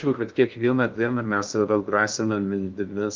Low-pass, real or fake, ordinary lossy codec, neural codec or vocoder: 7.2 kHz; fake; Opus, 32 kbps; codec, 16 kHz in and 24 kHz out, 0.6 kbps, FocalCodec, streaming, 2048 codes